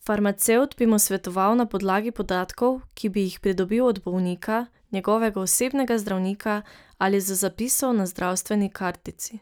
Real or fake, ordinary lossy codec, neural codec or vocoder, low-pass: real; none; none; none